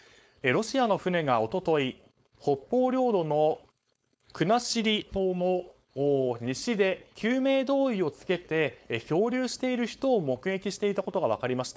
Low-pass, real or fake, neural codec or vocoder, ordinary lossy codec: none; fake; codec, 16 kHz, 4.8 kbps, FACodec; none